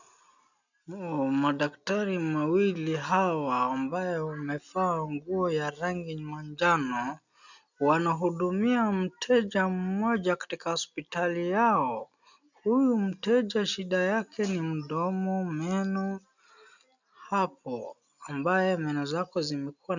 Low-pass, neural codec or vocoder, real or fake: 7.2 kHz; none; real